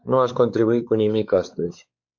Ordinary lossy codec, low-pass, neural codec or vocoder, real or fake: Opus, 64 kbps; 7.2 kHz; codec, 16 kHz, 4 kbps, X-Codec, WavLM features, trained on Multilingual LibriSpeech; fake